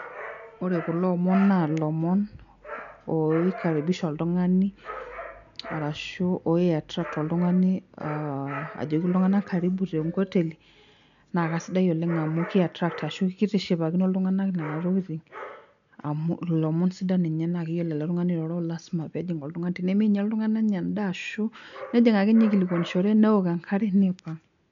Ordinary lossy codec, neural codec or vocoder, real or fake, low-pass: none; none; real; 7.2 kHz